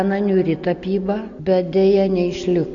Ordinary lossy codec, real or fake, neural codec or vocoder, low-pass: AAC, 64 kbps; real; none; 7.2 kHz